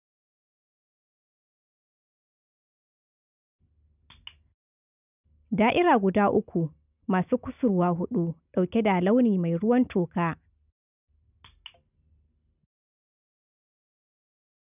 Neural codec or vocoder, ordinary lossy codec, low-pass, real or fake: none; none; 3.6 kHz; real